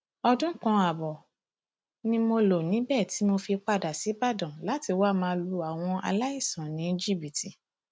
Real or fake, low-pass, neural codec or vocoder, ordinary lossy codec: real; none; none; none